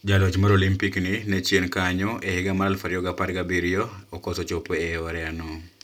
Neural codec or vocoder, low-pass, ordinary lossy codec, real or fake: none; 19.8 kHz; none; real